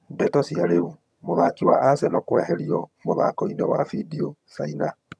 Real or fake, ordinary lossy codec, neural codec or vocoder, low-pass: fake; none; vocoder, 22.05 kHz, 80 mel bands, HiFi-GAN; none